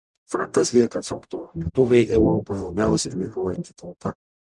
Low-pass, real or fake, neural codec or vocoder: 10.8 kHz; fake; codec, 44.1 kHz, 0.9 kbps, DAC